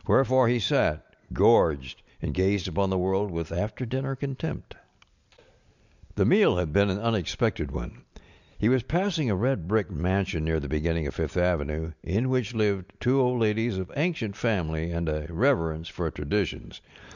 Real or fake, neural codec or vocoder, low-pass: real; none; 7.2 kHz